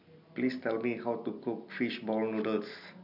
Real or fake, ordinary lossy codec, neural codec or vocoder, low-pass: real; none; none; 5.4 kHz